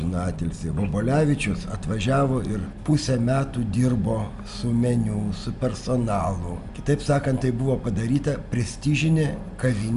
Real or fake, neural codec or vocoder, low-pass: real; none; 10.8 kHz